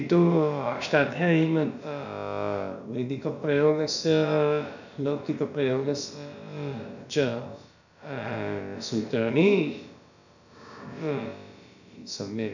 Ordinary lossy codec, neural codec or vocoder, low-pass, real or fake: none; codec, 16 kHz, about 1 kbps, DyCAST, with the encoder's durations; 7.2 kHz; fake